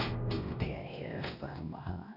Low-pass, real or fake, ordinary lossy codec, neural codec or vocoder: 5.4 kHz; fake; none; codec, 16 kHz, 1 kbps, X-Codec, WavLM features, trained on Multilingual LibriSpeech